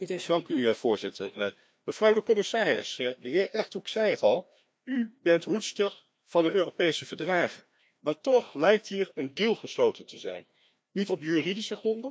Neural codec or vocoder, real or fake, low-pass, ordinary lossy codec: codec, 16 kHz, 1 kbps, FreqCodec, larger model; fake; none; none